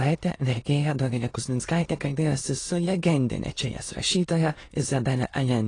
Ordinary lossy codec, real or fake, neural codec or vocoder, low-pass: AAC, 32 kbps; fake; autoencoder, 22.05 kHz, a latent of 192 numbers a frame, VITS, trained on many speakers; 9.9 kHz